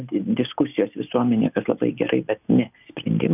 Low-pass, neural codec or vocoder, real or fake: 3.6 kHz; none; real